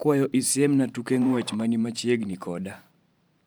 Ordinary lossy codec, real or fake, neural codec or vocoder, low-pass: none; fake; vocoder, 44.1 kHz, 128 mel bands every 512 samples, BigVGAN v2; none